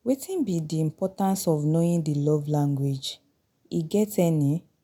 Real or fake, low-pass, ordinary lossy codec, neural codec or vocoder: real; 19.8 kHz; none; none